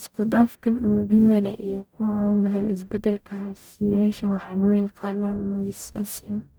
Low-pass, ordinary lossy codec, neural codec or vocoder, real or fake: none; none; codec, 44.1 kHz, 0.9 kbps, DAC; fake